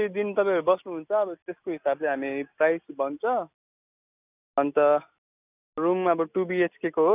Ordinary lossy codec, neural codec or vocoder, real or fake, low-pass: none; none; real; 3.6 kHz